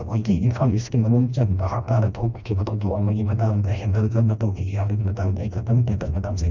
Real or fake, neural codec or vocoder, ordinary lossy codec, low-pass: fake; codec, 16 kHz, 1 kbps, FreqCodec, smaller model; none; 7.2 kHz